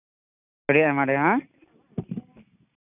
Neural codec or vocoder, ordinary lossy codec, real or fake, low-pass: none; none; real; 3.6 kHz